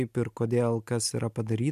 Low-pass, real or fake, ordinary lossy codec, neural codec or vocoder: 14.4 kHz; real; MP3, 96 kbps; none